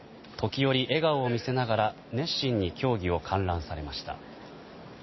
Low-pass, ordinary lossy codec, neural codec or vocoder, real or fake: 7.2 kHz; MP3, 24 kbps; none; real